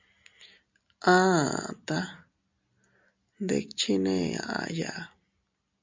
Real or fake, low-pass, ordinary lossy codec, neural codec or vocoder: real; 7.2 kHz; MP3, 64 kbps; none